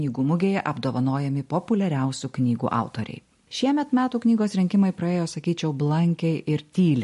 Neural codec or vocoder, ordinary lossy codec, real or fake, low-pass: none; MP3, 48 kbps; real; 14.4 kHz